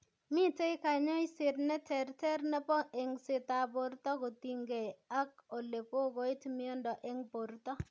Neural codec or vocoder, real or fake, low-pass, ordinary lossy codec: none; real; none; none